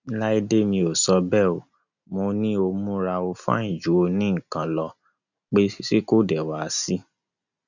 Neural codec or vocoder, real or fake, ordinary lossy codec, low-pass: none; real; none; 7.2 kHz